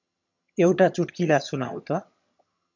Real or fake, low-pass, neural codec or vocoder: fake; 7.2 kHz; vocoder, 22.05 kHz, 80 mel bands, HiFi-GAN